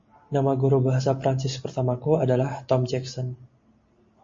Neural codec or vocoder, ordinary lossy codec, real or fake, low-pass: none; MP3, 32 kbps; real; 7.2 kHz